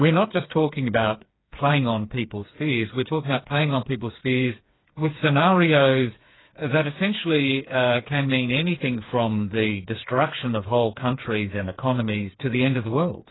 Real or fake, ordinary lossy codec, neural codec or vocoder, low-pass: fake; AAC, 16 kbps; codec, 16 kHz, 4 kbps, FreqCodec, smaller model; 7.2 kHz